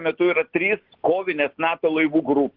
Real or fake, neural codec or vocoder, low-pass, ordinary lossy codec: real; none; 5.4 kHz; Opus, 16 kbps